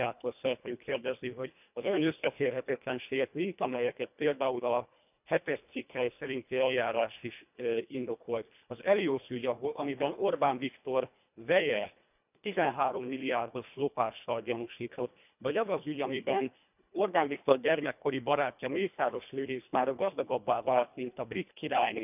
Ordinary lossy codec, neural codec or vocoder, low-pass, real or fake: none; codec, 24 kHz, 1.5 kbps, HILCodec; 3.6 kHz; fake